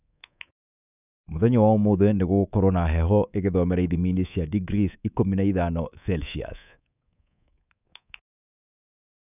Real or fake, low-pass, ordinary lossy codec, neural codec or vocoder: fake; 3.6 kHz; none; codec, 24 kHz, 3.1 kbps, DualCodec